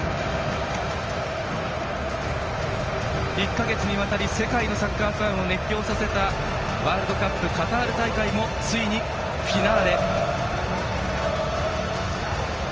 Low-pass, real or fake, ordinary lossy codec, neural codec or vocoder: 7.2 kHz; real; Opus, 24 kbps; none